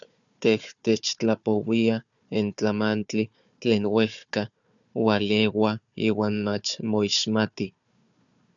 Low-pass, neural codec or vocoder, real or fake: 7.2 kHz; codec, 16 kHz, 4 kbps, FunCodec, trained on Chinese and English, 50 frames a second; fake